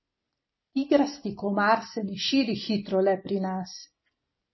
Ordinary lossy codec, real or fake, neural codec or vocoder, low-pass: MP3, 24 kbps; real; none; 7.2 kHz